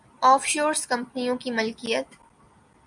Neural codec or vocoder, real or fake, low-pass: none; real; 10.8 kHz